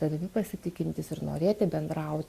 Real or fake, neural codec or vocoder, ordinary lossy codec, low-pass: real; none; Opus, 64 kbps; 14.4 kHz